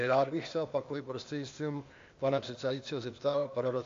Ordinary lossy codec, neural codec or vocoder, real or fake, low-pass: AAC, 48 kbps; codec, 16 kHz, 0.8 kbps, ZipCodec; fake; 7.2 kHz